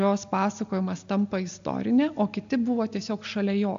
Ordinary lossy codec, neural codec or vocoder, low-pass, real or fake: AAC, 64 kbps; none; 7.2 kHz; real